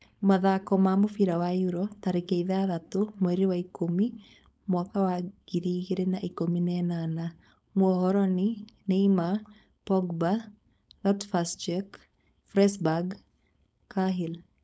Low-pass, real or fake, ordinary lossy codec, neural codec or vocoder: none; fake; none; codec, 16 kHz, 4.8 kbps, FACodec